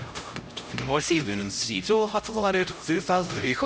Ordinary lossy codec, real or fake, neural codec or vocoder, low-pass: none; fake; codec, 16 kHz, 0.5 kbps, X-Codec, HuBERT features, trained on LibriSpeech; none